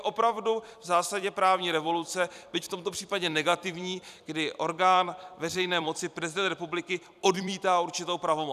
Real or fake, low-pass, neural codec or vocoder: fake; 14.4 kHz; vocoder, 44.1 kHz, 128 mel bands every 256 samples, BigVGAN v2